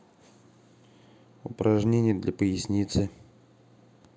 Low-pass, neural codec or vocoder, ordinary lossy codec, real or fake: none; none; none; real